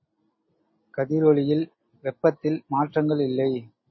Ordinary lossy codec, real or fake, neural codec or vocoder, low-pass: MP3, 24 kbps; real; none; 7.2 kHz